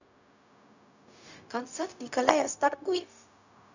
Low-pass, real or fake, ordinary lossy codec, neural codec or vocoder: 7.2 kHz; fake; none; codec, 16 kHz, 0.4 kbps, LongCat-Audio-Codec